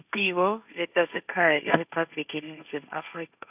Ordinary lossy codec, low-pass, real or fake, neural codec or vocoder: none; 3.6 kHz; fake; codec, 16 kHz, 1.1 kbps, Voila-Tokenizer